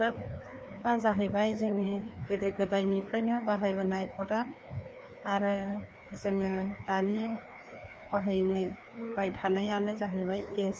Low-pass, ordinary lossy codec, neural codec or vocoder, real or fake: none; none; codec, 16 kHz, 2 kbps, FreqCodec, larger model; fake